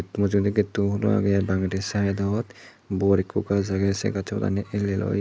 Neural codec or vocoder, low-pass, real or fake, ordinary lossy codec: none; none; real; none